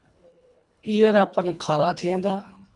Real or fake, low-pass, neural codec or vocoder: fake; 10.8 kHz; codec, 24 kHz, 1.5 kbps, HILCodec